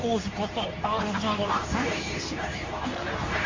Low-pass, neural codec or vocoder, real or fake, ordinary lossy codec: 7.2 kHz; codec, 24 kHz, 0.9 kbps, WavTokenizer, medium speech release version 2; fake; MP3, 48 kbps